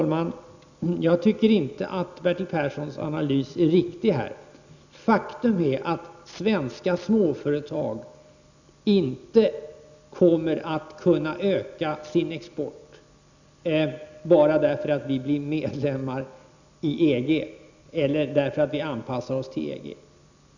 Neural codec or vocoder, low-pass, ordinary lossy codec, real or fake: none; 7.2 kHz; none; real